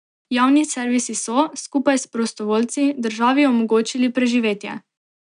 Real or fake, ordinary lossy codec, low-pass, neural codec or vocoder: real; none; 9.9 kHz; none